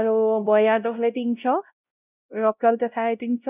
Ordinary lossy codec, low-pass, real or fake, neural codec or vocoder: none; 3.6 kHz; fake; codec, 16 kHz, 0.5 kbps, X-Codec, WavLM features, trained on Multilingual LibriSpeech